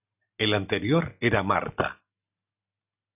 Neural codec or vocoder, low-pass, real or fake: none; 3.6 kHz; real